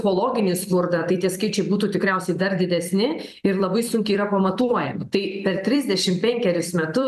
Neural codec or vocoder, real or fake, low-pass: vocoder, 44.1 kHz, 128 mel bands every 256 samples, BigVGAN v2; fake; 14.4 kHz